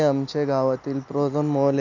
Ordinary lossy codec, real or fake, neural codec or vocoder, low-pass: none; real; none; 7.2 kHz